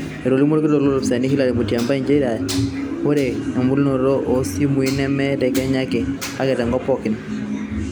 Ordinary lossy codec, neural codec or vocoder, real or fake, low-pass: none; none; real; none